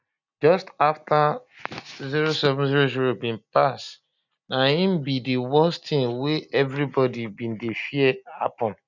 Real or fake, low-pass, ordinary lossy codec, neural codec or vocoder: real; 7.2 kHz; none; none